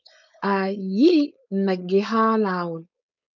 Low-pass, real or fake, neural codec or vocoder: 7.2 kHz; fake; codec, 16 kHz, 4.8 kbps, FACodec